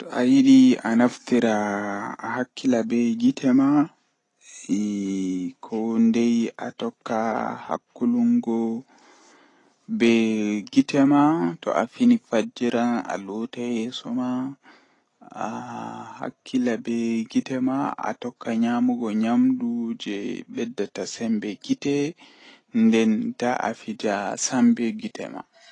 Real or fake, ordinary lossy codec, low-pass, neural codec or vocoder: real; AAC, 32 kbps; 10.8 kHz; none